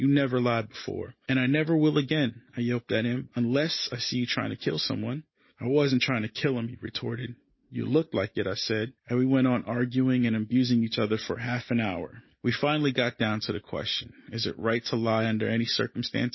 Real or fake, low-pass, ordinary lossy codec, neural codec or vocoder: real; 7.2 kHz; MP3, 24 kbps; none